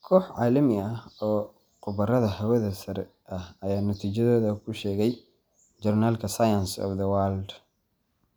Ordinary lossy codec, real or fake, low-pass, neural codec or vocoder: none; real; none; none